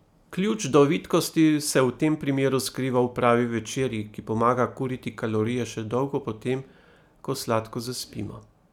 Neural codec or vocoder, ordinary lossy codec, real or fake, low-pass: none; none; real; 19.8 kHz